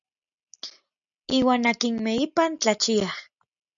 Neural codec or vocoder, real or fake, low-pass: none; real; 7.2 kHz